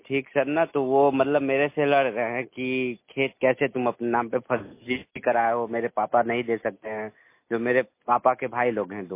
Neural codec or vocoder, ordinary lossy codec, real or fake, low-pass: none; MP3, 24 kbps; real; 3.6 kHz